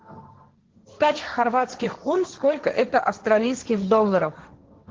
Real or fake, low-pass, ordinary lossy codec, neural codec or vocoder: fake; 7.2 kHz; Opus, 16 kbps; codec, 16 kHz, 1.1 kbps, Voila-Tokenizer